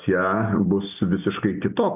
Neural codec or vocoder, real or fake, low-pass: none; real; 3.6 kHz